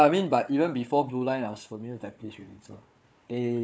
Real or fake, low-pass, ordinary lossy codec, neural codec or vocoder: fake; none; none; codec, 16 kHz, 4 kbps, FunCodec, trained on Chinese and English, 50 frames a second